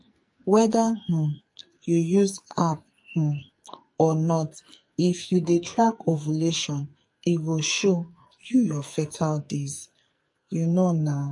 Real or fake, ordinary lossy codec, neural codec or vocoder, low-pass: fake; MP3, 48 kbps; codec, 44.1 kHz, 2.6 kbps, SNAC; 10.8 kHz